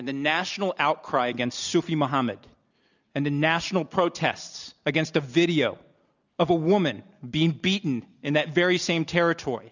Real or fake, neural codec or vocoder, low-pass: real; none; 7.2 kHz